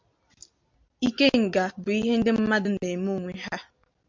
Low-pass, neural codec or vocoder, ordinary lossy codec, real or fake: 7.2 kHz; none; MP3, 64 kbps; real